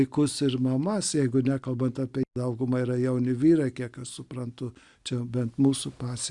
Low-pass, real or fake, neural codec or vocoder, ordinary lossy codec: 10.8 kHz; real; none; Opus, 64 kbps